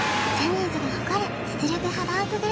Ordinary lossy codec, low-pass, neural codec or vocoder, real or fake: none; none; none; real